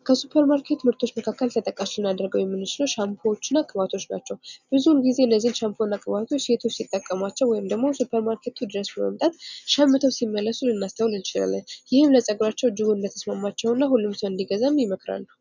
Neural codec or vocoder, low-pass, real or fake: none; 7.2 kHz; real